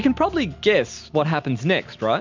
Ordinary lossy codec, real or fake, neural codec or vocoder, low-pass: MP3, 64 kbps; real; none; 7.2 kHz